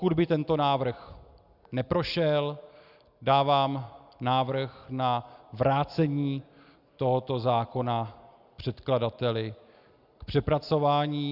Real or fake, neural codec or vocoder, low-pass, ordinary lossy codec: real; none; 5.4 kHz; Opus, 64 kbps